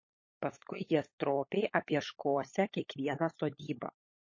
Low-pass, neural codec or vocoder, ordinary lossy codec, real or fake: 7.2 kHz; codec, 16 kHz, 16 kbps, FunCodec, trained on LibriTTS, 50 frames a second; MP3, 32 kbps; fake